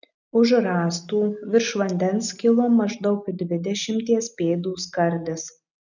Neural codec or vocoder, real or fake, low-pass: none; real; 7.2 kHz